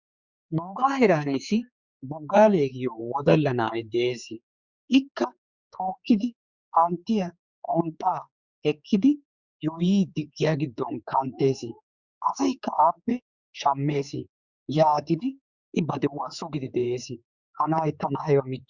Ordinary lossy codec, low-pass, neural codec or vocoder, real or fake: Opus, 64 kbps; 7.2 kHz; codec, 16 kHz, 4 kbps, X-Codec, HuBERT features, trained on general audio; fake